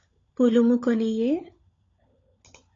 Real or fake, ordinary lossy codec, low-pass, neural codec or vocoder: fake; AAC, 32 kbps; 7.2 kHz; codec, 16 kHz, 16 kbps, FunCodec, trained on LibriTTS, 50 frames a second